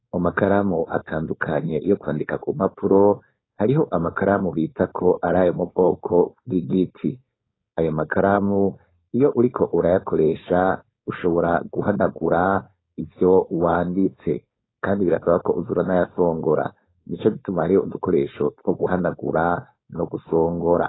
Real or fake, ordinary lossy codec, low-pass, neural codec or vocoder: fake; AAC, 16 kbps; 7.2 kHz; codec, 16 kHz, 4.8 kbps, FACodec